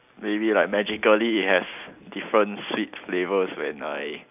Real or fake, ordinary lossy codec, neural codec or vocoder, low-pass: real; none; none; 3.6 kHz